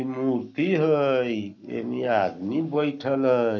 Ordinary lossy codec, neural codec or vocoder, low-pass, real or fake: none; codec, 44.1 kHz, 7.8 kbps, Pupu-Codec; 7.2 kHz; fake